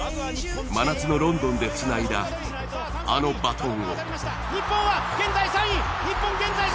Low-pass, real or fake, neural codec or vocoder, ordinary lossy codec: none; real; none; none